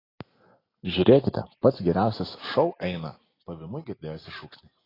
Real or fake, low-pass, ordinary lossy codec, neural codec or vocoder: real; 5.4 kHz; AAC, 24 kbps; none